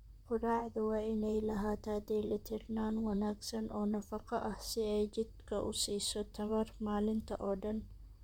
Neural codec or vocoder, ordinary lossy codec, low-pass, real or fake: vocoder, 44.1 kHz, 128 mel bands, Pupu-Vocoder; none; 19.8 kHz; fake